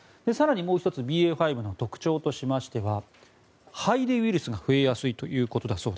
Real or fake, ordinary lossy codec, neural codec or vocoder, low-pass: real; none; none; none